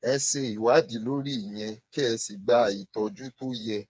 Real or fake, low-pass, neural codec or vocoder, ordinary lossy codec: fake; none; codec, 16 kHz, 4 kbps, FreqCodec, smaller model; none